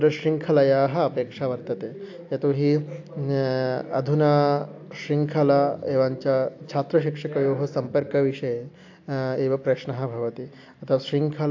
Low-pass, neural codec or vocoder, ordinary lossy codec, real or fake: 7.2 kHz; none; AAC, 48 kbps; real